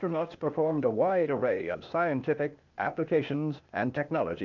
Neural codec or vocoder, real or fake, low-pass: codec, 16 kHz, 0.8 kbps, ZipCodec; fake; 7.2 kHz